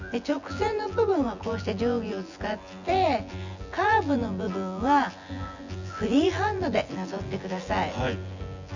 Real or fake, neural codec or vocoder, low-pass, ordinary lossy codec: fake; vocoder, 24 kHz, 100 mel bands, Vocos; 7.2 kHz; Opus, 64 kbps